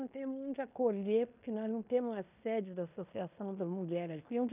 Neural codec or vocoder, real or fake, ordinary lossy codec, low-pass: codec, 16 kHz in and 24 kHz out, 0.9 kbps, LongCat-Audio-Codec, fine tuned four codebook decoder; fake; none; 3.6 kHz